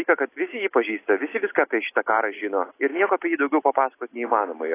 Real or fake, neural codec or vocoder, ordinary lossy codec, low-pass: real; none; AAC, 24 kbps; 3.6 kHz